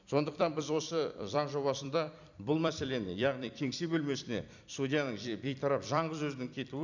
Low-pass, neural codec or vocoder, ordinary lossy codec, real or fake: 7.2 kHz; vocoder, 44.1 kHz, 128 mel bands every 256 samples, BigVGAN v2; none; fake